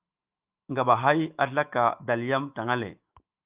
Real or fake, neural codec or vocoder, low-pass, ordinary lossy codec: fake; autoencoder, 48 kHz, 128 numbers a frame, DAC-VAE, trained on Japanese speech; 3.6 kHz; Opus, 24 kbps